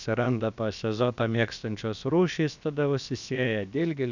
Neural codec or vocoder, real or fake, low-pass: codec, 16 kHz, about 1 kbps, DyCAST, with the encoder's durations; fake; 7.2 kHz